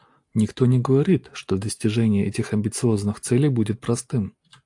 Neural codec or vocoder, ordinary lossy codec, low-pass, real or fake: none; AAC, 64 kbps; 10.8 kHz; real